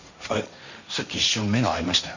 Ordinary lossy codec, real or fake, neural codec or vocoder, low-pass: none; fake; codec, 16 kHz, 1.1 kbps, Voila-Tokenizer; 7.2 kHz